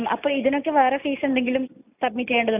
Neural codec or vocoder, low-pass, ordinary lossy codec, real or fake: none; 3.6 kHz; none; real